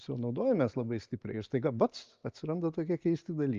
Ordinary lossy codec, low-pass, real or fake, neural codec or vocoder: Opus, 32 kbps; 7.2 kHz; real; none